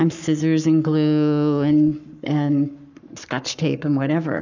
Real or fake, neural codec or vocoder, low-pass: fake; codec, 44.1 kHz, 7.8 kbps, Pupu-Codec; 7.2 kHz